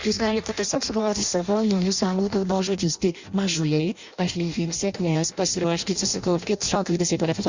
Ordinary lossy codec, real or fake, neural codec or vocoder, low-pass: Opus, 64 kbps; fake; codec, 16 kHz in and 24 kHz out, 0.6 kbps, FireRedTTS-2 codec; 7.2 kHz